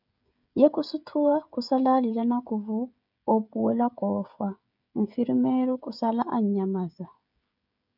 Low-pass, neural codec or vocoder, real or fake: 5.4 kHz; codec, 16 kHz, 16 kbps, FreqCodec, smaller model; fake